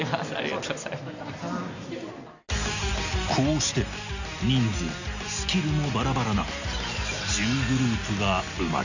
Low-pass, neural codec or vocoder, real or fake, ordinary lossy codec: 7.2 kHz; none; real; none